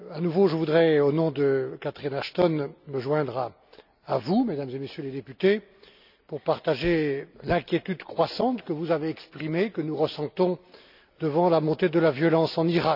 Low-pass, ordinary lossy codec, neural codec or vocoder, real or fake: 5.4 kHz; none; none; real